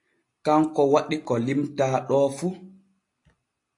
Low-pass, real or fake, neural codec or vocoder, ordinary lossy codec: 10.8 kHz; real; none; AAC, 48 kbps